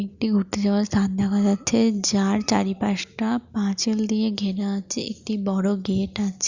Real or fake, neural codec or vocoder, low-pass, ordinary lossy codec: real; none; 7.2 kHz; Opus, 64 kbps